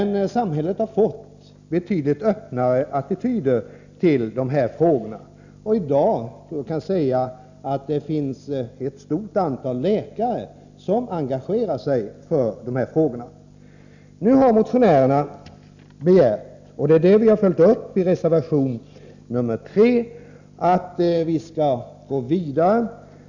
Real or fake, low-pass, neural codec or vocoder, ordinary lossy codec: real; 7.2 kHz; none; none